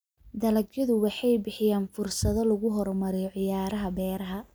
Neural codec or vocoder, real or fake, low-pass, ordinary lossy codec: none; real; none; none